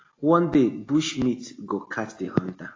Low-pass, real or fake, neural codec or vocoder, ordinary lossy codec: 7.2 kHz; real; none; AAC, 32 kbps